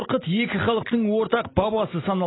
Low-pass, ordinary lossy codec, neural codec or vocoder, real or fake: 7.2 kHz; AAC, 16 kbps; none; real